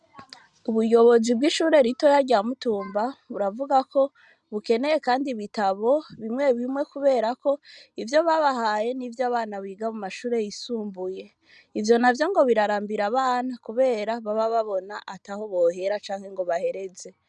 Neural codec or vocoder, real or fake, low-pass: none; real; 10.8 kHz